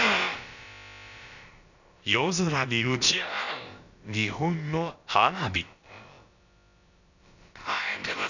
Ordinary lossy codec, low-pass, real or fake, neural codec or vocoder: none; 7.2 kHz; fake; codec, 16 kHz, about 1 kbps, DyCAST, with the encoder's durations